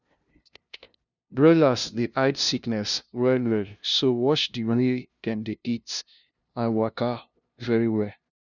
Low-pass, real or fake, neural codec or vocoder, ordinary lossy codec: 7.2 kHz; fake; codec, 16 kHz, 0.5 kbps, FunCodec, trained on LibriTTS, 25 frames a second; none